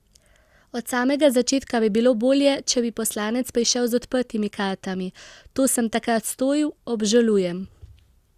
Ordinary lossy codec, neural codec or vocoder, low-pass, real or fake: Opus, 64 kbps; none; 14.4 kHz; real